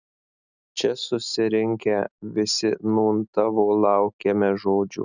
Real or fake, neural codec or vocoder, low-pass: real; none; 7.2 kHz